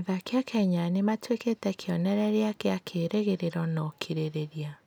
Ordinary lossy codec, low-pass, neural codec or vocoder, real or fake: none; none; none; real